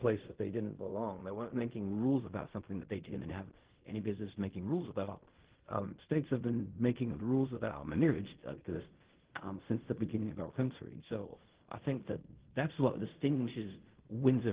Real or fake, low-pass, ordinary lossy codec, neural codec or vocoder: fake; 3.6 kHz; Opus, 16 kbps; codec, 16 kHz in and 24 kHz out, 0.4 kbps, LongCat-Audio-Codec, fine tuned four codebook decoder